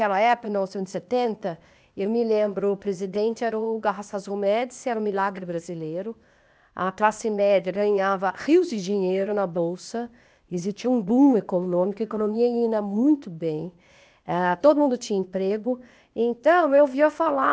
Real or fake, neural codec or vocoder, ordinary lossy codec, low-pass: fake; codec, 16 kHz, 0.8 kbps, ZipCodec; none; none